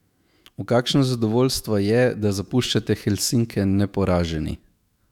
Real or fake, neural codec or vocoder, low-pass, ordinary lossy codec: fake; autoencoder, 48 kHz, 128 numbers a frame, DAC-VAE, trained on Japanese speech; 19.8 kHz; none